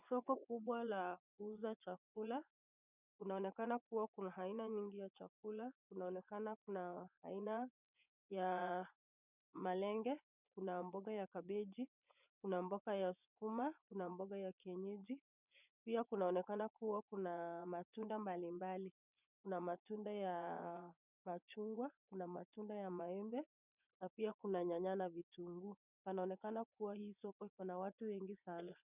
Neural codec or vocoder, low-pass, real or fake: vocoder, 24 kHz, 100 mel bands, Vocos; 3.6 kHz; fake